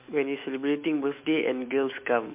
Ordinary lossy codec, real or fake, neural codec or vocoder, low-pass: MP3, 32 kbps; fake; autoencoder, 48 kHz, 128 numbers a frame, DAC-VAE, trained on Japanese speech; 3.6 kHz